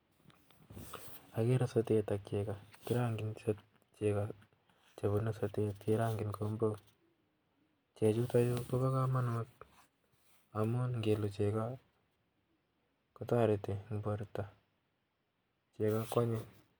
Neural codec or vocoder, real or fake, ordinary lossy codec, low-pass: none; real; none; none